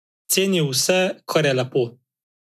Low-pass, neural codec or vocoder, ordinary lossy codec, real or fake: 14.4 kHz; none; none; real